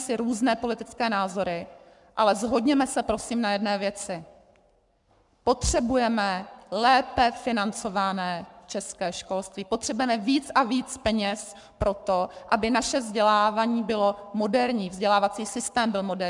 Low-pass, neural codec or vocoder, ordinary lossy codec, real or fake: 10.8 kHz; codec, 44.1 kHz, 7.8 kbps, Pupu-Codec; MP3, 96 kbps; fake